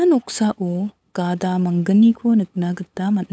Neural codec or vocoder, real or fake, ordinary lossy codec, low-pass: codec, 16 kHz, 8 kbps, FunCodec, trained on LibriTTS, 25 frames a second; fake; none; none